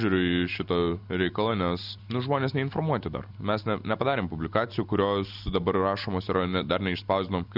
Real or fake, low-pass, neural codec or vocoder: real; 5.4 kHz; none